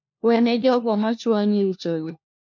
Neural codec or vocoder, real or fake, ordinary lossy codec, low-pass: codec, 16 kHz, 1 kbps, FunCodec, trained on LibriTTS, 50 frames a second; fake; MP3, 48 kbps; 7.2 kHz